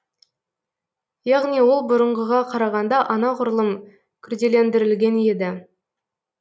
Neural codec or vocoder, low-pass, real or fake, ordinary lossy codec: none; none; real; none